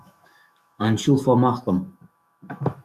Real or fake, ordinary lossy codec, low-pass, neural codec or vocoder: fake; MP3, 96 kbps; 14.4 kHz; autoencoder, 48 kHz, 128 numbers a frame, DAC-VAE, trained on Japanese speech